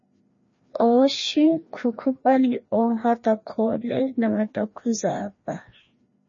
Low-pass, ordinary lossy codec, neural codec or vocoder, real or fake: 7.2 kHz; MP3, 32 kbps; codec, 16 kHz, 1 kbps, FreqCodec, larger model; fake